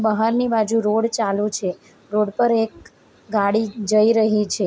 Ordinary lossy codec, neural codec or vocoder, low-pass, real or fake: none; none; none; real